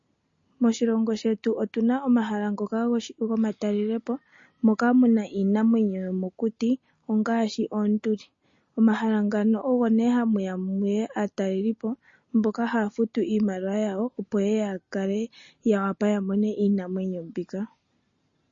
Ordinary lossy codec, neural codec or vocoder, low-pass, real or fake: MP3, 32 kbps; none; 7.2 kHz; real